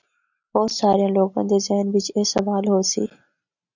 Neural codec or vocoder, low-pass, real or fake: none; 7.2 kHz; real